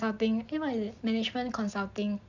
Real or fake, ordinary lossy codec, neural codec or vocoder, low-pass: real; none; none; 7.2 kHz